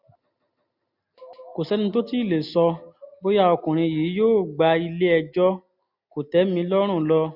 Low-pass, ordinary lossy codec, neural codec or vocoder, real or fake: 5.4 kHz; none; none; real